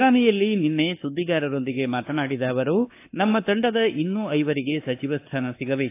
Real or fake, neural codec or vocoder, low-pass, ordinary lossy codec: fake; codec, 24 kHz, 1.2 kbps, DualCodec; 3.6 kHz; AAC, 24 kbps